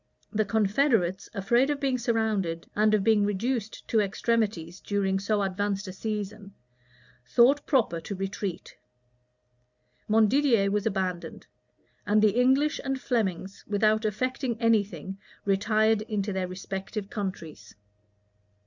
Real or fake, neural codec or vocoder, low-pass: real; none; 7.2 kHz